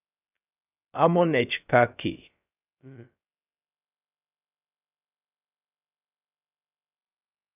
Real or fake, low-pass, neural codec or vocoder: fake; 3.6 kHz; codec, 16 kHz, 0.3 kbps, FocalCodec